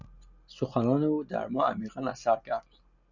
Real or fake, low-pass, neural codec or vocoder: real; 7.2 kHz; none